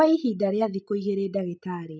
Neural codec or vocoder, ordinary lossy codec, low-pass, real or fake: none; none; none; real